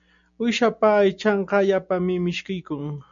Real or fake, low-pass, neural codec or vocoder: real; 7.2 kHz; none